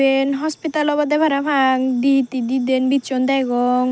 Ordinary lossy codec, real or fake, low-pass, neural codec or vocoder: none; real; none; none